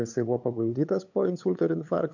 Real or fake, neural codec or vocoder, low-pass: fake; codec, 16 kHz, 8 kbps, FunCodec, trained on LibriTTS, 25 frames a second; 7.2 kHz